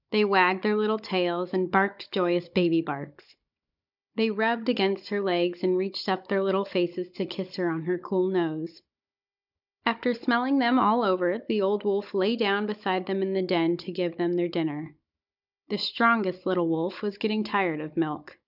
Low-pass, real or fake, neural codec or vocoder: 5.4 kHz; fake; codec, 16 kHz, 16 kbps, FunCodec, trained on Chinese and English, 50 frames a second